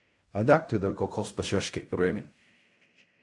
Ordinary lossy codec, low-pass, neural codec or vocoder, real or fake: AAC, 64 kbps; 10.8 kHz; codec, 16 kHz in and 24 kHz out, 0.4 kbps, LongCat-Audio-Codec, fine tuned four codebook decoder; fake